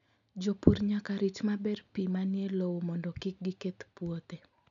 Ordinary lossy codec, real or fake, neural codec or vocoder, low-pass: none; real; none; 7.2 kHz